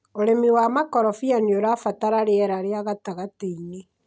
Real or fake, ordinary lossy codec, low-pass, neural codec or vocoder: real; none; none; none